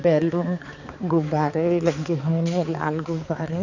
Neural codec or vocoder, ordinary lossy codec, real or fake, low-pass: codec, 16 kHz, 4 kbps, X-Codec, HuBERT features, trained on balanced general audio; none; fake; 7.2 kHz